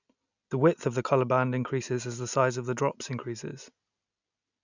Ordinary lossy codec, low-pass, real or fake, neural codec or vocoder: none; 7.2 kHz; real; none